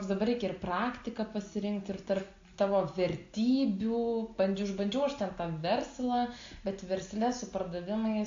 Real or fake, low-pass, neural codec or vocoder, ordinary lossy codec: real; 7.2 kHz; none; AAC, 48 kbps